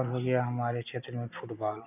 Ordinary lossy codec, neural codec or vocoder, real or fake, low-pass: none; none; real; 3.6 kHz